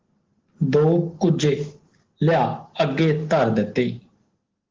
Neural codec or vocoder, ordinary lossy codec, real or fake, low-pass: none; Opus, 16 kbps; real; 7.2 kHz